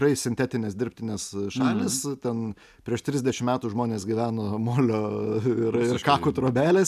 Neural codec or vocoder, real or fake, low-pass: none; real; 14.4 kHz